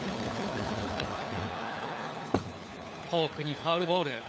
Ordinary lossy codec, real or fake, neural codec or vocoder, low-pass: none; fake; codec, 16 kHz, 4 kbps, FunCodec, trained on LibriTTS, 50 frames a second; none